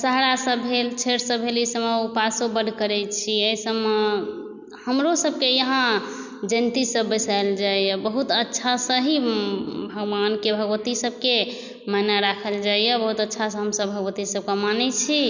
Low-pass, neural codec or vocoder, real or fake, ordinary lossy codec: 7.2 kHz; none; real; none